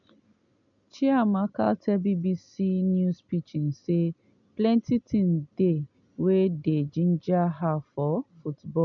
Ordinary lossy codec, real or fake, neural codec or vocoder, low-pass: none; real; none; 7.2 kHz